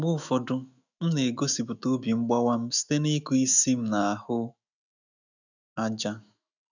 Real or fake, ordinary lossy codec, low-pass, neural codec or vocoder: fake; none; 7.2 kHz; autoencoder, 48 kHz, 128 numbers a frame, DAC-VAE, trained on Japanese speech